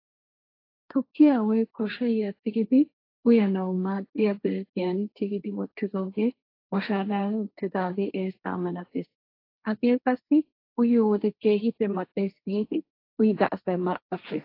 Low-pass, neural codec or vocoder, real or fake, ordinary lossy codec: 5.4 kHz; codec, 16 kHz, 1.1 kbps, Voila-Tokenizer; fake; AAC, 32 kbps